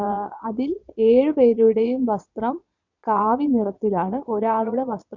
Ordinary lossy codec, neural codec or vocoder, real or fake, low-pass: none; vocoder, 22.05 kHz, 80 mel bands, Vocos; fake; 7.2 kHz